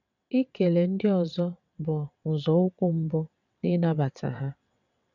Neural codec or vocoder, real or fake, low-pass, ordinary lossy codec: vocoder, 22.05 kHz, 80 mel bands, WaveNeXt; fake; 7.2 kHz; none